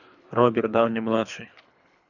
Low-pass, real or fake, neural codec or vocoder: 7.2 kHz; fake; codec, 24 kHz, 3 kbps, HILCodec